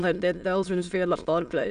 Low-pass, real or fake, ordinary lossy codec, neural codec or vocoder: 9.9 kHz; fake; none; autoencoder, 22.05 kHz, a latent of 192 numbers a frame, VITS, trained on many speakers